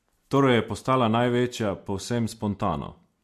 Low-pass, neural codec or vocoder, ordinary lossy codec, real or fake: 14.4 kHz; none; MP3, 64 kbps; real